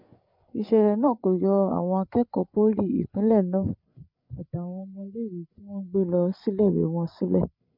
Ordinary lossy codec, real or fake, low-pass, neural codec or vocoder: MP3, 48 kbps; fake; 5.4 kHz; codec, 16 kHz, 8 kbps, FreqCodec, larger model